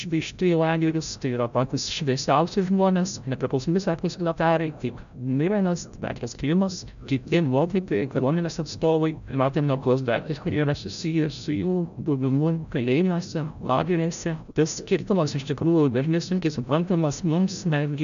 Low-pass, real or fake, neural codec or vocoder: 7.2 kHz; fake; codec, 16 kHz, 0.5 kbps, FreqCodec, larger model